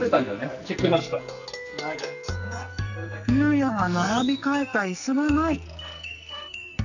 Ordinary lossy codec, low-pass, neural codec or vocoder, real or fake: none; 7.2 kHz; codec, 44.1 kHz, 2.6 kbps, SNAC; fake